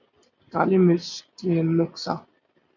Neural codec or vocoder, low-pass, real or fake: none; 7.2 kHz; real